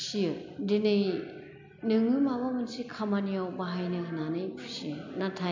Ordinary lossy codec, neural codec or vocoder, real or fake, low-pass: MP3, 64 kbps; none; real; 7.2 kHz